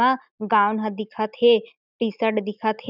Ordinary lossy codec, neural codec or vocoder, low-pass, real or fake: none; none; 5.4 kHz; real